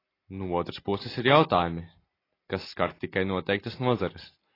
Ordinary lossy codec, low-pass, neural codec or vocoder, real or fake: AAC, 24 kbps; 5.4 kHz; none; real